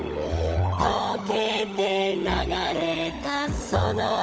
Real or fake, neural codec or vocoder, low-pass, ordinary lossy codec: fake; codec, 16 kHz, 16 kbps, FunCodec, trained on LibriTTS, 50 frames a second; none; none